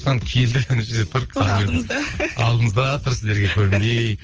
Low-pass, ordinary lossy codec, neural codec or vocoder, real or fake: 7.2 kHz; Opus, 24 kbps; codec, 24 kHz, 6 kbps, HILCodec; fake